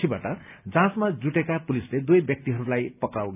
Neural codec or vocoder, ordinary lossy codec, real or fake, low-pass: none; none; real; 3.6 kHz